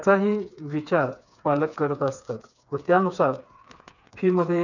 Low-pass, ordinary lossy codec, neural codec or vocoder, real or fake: 7.2 kHz; none; codec, 16 kHz, 4 kbps, FreqCodec, smaller model; fake